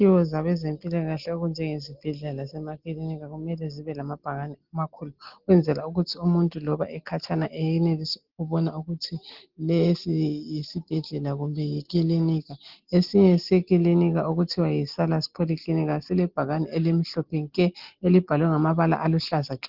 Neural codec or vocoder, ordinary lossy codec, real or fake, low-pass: none; Opus, 16 kbps; real; 5.4 kHz